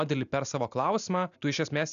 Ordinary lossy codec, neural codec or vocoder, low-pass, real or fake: MP3, 96 kbps; none; 7.2 kHz; real